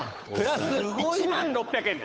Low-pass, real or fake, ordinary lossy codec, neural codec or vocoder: none; fake; none; codec, 16 kHz, 8 kbps, FunCodec, trained on Chinese and English, 25 frames a second